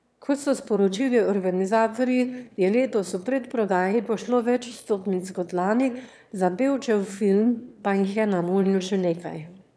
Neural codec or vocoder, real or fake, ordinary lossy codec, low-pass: autoencoder, 22.05 kHz, a latent of 192 numbers a frame, VITS, trained on one speaker; fake; none; none